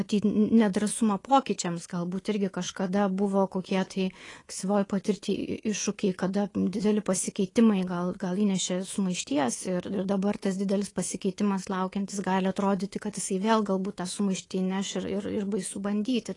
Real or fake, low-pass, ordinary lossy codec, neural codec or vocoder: fake; 10.8 kHz; AAC, 32 kbps; codec, 24 kHz, 3.1 kbps, DualCodec